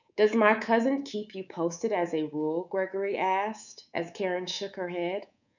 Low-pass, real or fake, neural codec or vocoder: 7.2 kHz; fake; codec, 24 kHz, 3.1 kbps, DualCodec